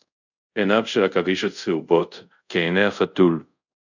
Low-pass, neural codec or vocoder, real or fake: 7.2 kHz; codec, 24 kHz, 0.5 kbps, DualCodec; fake